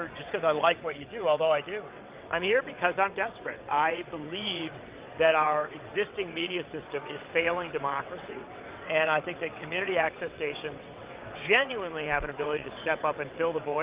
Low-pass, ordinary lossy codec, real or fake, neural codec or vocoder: 3.6 kHz; Opus, 32 kbps; fake; vocoder, 22.05 kHz, 80 mel bands, WaveNeXt